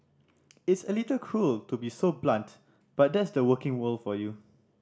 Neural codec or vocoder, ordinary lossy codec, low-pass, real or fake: none; none; none; real